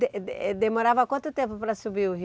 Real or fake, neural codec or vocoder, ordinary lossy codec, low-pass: real; none; none; none